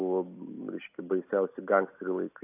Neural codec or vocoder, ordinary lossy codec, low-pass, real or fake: none; AAC, 24 kbps; 3.6 kHz; real